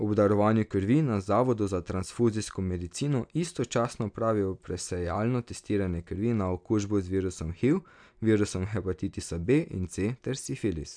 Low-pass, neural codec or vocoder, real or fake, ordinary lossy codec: 9.9 kHz; none; real; none